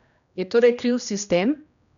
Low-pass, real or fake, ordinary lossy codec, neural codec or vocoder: 7.2 kHz; fake; none; codec, 16 kHz, 1 kbps, X-Codec, HuBERT features, trained on general audio